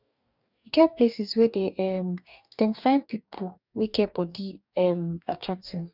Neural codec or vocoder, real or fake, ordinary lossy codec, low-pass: codec, 44.1 kHz, 2.6 kbps, DAC; fake; none; 5.4 kHz